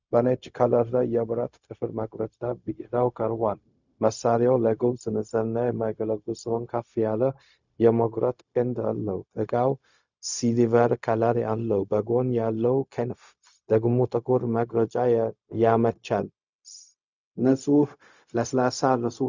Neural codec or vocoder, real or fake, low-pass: codec, 16 kHz, 0.4 kbps, LongCat-Audio-Codec; fake; 7.2 kHz